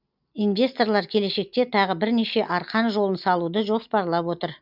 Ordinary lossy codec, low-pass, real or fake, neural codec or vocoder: none; 5.4 kHz; real; none